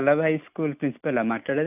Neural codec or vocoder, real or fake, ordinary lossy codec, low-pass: none; real; none; 3.6 kHz